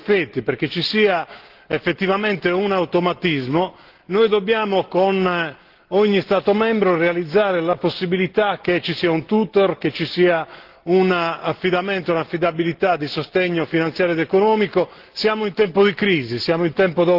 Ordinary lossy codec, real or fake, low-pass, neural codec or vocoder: Opus, 16 kbps; real; 5.4 kHz; none